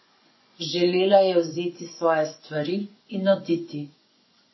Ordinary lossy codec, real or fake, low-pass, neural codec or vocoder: MP3, 24 kbps; fake; 7.2 kHz; vocoder, 24 kHz, 100 mel bands, Vocos